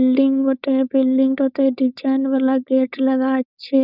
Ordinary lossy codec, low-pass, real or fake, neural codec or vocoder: none; 5.4 kHz; fake; codec, 16 kHz, 4.8 kbps, FACodec